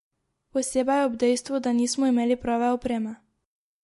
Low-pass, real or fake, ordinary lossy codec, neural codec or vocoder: 14.4 kHz; real; MP3, 48 kbps; none